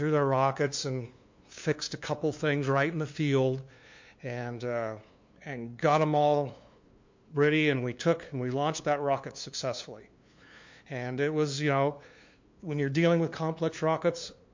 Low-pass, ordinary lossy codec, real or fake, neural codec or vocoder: 7.2 kHz; MP3, 48 kbps; fake; codec, 16 kHz, 2 kbps, FunCodec, trained on LibriTTS, 25 frames a second